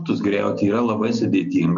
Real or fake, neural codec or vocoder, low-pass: real; none; 7.2 kHz